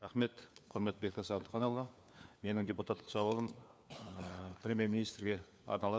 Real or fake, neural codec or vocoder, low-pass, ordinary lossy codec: fake; codec, 16 kHz, 4 kbps, FunCodec, trained on LibriTTS, 50 frames a second; none; none